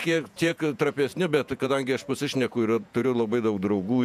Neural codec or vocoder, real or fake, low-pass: vocoder, 48 kHz, 128 mel bands, Vocos; fake; 14.4 kHz